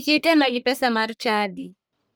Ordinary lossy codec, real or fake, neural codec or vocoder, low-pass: none; fake; codec, 44.1 kHz, 1.7 kbps, Pupu-Codec; none